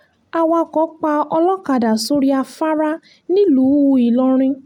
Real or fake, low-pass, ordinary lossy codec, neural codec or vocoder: real; 19.8 kHz; none; none